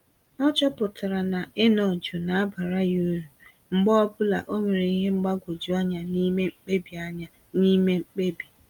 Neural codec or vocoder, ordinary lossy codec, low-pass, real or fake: none; Opus, 32 kbps; 19.8 kHz; real